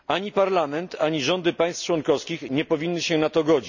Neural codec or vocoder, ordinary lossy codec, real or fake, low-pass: none; none; real; 7.2 kHz